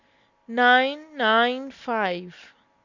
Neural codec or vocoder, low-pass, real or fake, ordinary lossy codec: none; 7.2 kHz; real; Opus, 64 kbps